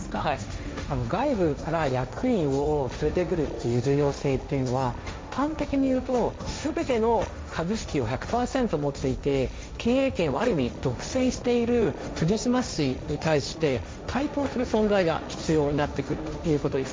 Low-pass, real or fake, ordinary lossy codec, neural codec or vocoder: none; fake; none; codec, 16 kHz, 1.1 kbps, Voila-Tokenizer